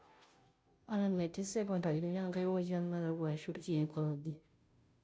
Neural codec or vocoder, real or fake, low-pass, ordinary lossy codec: codec, 16 kHz, 0.5 kbps, FunCodec, trained on Chinese and English, 25 frames a second; fake; none; none